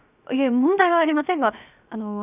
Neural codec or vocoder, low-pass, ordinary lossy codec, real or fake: codec, 16 kHz, 0.7 kbps, FocalCodec; 3.6 kHz; none; fake